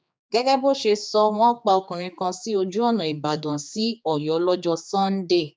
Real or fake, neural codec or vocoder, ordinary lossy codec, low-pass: fake; codec, 16 kHz, 4 kbps, X-Codec, HuBERT features, trained on general audio; none; none